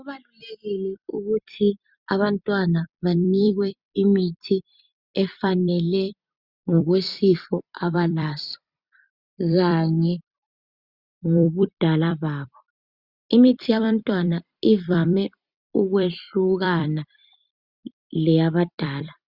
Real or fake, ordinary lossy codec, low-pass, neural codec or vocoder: fake; Opus, 64 kbps; 5.4 kHz; vocoder, 44.1 kHz, 128 mel bands every 256 samples, BigVGAN v2